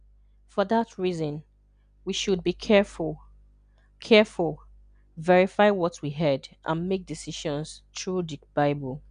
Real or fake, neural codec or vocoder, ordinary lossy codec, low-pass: real; none; none; 9.9 kHz